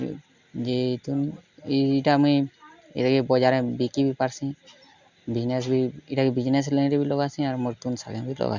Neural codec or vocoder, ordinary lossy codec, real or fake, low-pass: none; Opus, 64 kbps; real; 7.2 kHz